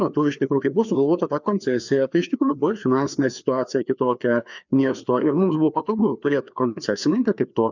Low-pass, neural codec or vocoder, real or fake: 7.2 kHz; codec, 16 kHz, 2 kbps, FreqCodec, larger model; fake